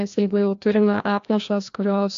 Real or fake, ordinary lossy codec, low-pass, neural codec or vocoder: fake; AAC, 64 kbps; 7.2 kHz; codec, 16 kHz, 1 kbps, FreqCodec, larger model